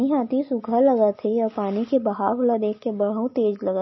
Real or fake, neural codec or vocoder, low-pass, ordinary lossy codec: real; none; 7.2 kHz; MP3, 24 kbps